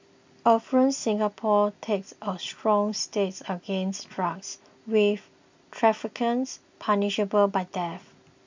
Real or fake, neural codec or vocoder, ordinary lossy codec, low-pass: real; none; MP3, 64 kbps; 7.2 kHz